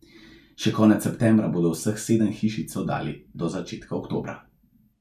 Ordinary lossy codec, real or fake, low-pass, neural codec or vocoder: none; real; 14.4 kHz; none